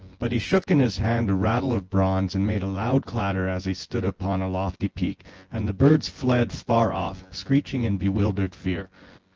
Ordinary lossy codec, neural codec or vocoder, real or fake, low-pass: Opus, 16 kbps; vocoder, 24 kHz, 100 mel bands, Vocos; fake; 7.2 kHz